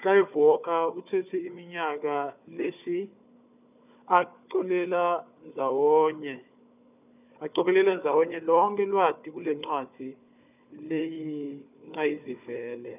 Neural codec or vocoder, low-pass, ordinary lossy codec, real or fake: codec, 16 kHz, 4 kbps, FunCodec, trained on Chinese and English, 50 frames a second; 3.6 kHz; none; fake